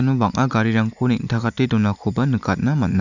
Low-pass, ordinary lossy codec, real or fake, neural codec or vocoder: 7.2 kHz; none; real; none